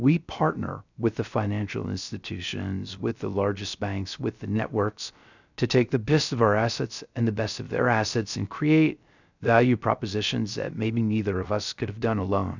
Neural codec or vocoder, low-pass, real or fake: codec, 16 kHz, 0.3 kbps, FocalCodec; 7.2 kHz; fake